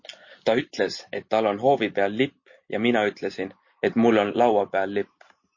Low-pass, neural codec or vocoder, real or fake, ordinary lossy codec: 7.2 kHz; none; real; MP3, 32 kbps